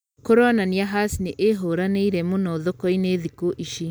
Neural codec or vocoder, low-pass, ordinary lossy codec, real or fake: none; none; none; real